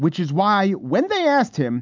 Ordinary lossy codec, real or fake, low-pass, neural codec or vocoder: MP3, 64 kbps; real; 7.2 kHz; none